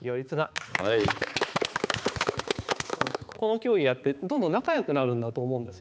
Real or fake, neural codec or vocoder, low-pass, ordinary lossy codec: fake; codec, 16 kHz, 4 kbps, X-Codec, HuBERT features, trained on balanced general audio; none; none